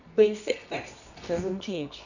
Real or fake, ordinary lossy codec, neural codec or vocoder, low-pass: fake; none; codec, 24 kHz, 0.9 kbps, WavTokenizer, medium music audio release; 7.2 kHz